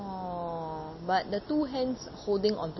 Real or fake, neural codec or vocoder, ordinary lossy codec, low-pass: real; none; MP3, 24 kbps; 7.2 kHz